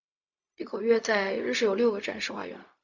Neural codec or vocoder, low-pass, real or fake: codec, 16 kHz, 0.4 kbps, LongCat-Audio-Codec; 7.2 kHz; fake